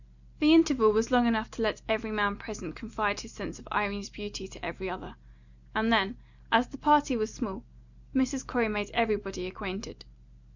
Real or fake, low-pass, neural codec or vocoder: real; 7.2 kHz; none